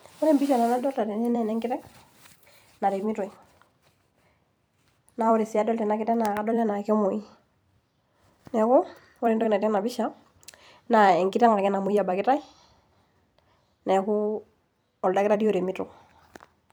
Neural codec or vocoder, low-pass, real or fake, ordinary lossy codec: vocoder, 44.1 kHz, 128 mel bands every 256 samples, BigVGAN v2; none; fake; none